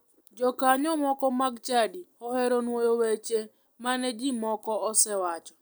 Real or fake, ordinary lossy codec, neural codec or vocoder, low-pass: real; none; none; none